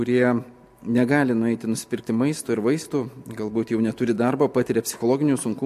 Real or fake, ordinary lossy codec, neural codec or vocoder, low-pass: real; MP3, 64 kbps; none; 14.4 kHz